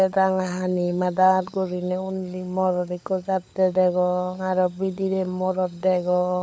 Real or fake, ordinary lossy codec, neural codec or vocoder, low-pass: fake; none; codec, 16 kHz, 16 kbps, FunCodec, trained on LibriTTS, 50 frames a second; none